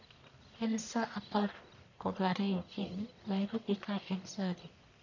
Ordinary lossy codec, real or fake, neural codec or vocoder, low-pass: none; fake; codec, 44.1 kHz, 1.7 kbps, Pupu-Codec; 7.2 kHz